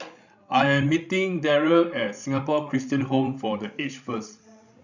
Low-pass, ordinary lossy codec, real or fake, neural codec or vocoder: 7.2 kHz; none; fake; codec, 16 kHz, 16 kbps, FreqCodec, larger model